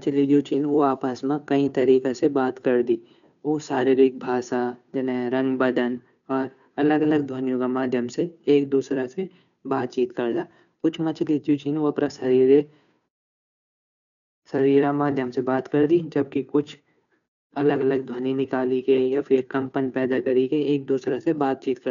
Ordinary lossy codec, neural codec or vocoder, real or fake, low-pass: none; codec, 16 kHz, 2 kbps, FunCodec, trained on Chinese and English, 25 frames a second; fake; 7.2 kHz